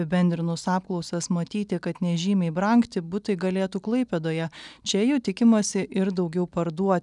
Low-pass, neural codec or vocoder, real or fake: 10.8 kHz; none; real